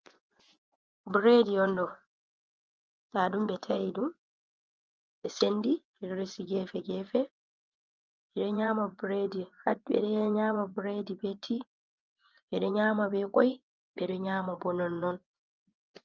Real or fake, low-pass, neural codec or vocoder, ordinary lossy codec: fake; 7.2 kHz; vocoder, 24 kHz, 100 mel bands, Vocos; Opus, 24 kbps